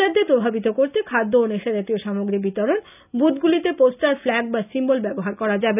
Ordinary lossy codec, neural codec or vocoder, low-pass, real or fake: none; none; 3.6 kHz; real